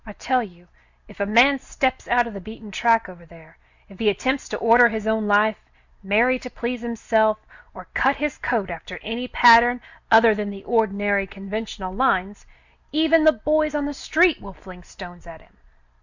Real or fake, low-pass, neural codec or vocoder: real; 7.2 kHz; none